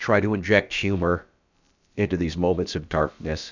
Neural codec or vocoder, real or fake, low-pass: codec, 16 kHz, about 1 kbps, DyCAST, with the encoder's durations; fake; 7.2 kHz